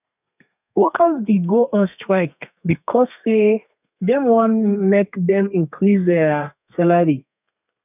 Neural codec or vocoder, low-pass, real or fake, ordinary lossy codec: codec, 32 kHz, 1.9 kbps, SNAC; 3.6 kHz; fake; none